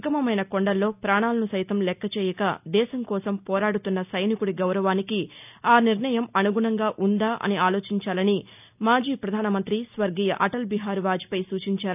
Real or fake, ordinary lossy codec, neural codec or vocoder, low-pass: real; none; none; 3.6 kHz